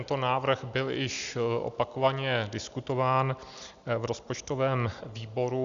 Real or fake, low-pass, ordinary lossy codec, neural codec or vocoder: real; 7.2 kHz; Opus, 64 kbps; none